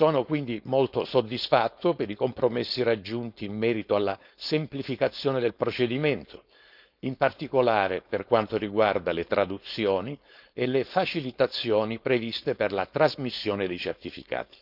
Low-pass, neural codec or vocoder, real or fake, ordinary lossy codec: 5.4 kHz; codec, 16 kHz, 4.8 kbps, FACodec; fake; none